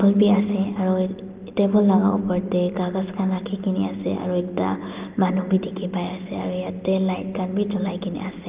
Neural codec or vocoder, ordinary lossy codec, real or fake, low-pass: none; Opus, 32 kbps; real; 3.6 kHz